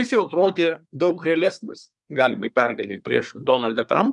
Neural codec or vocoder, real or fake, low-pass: codec, 24 kHz, 1 kbps, SNAC; fake; 10.8 kHz